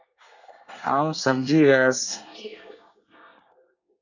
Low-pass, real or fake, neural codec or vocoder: 7.2 kHz; fake; codec, 24 kHz, 1 kbps, SNAC